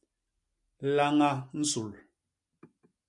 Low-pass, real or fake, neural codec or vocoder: 10.8 kHz; real; none